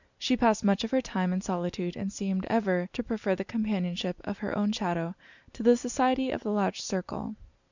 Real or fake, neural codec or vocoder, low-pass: real; none; 7.2 kHz